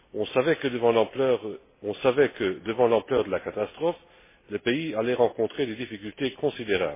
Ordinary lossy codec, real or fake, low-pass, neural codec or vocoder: MP3, 16 kbps; real; 3.6 kHz; none